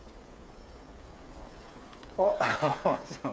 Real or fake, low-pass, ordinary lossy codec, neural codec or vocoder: fake; none; none; codec, 16 kHz, 8 kbps, FreqCodec, smaller model